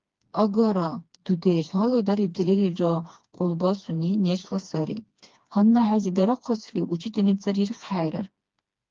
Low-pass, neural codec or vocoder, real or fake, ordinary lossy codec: 7.2 kHz; codec, 16 kHz, 2 kbps, FreqCodec, smaller model; fake; Opus, 32 kbps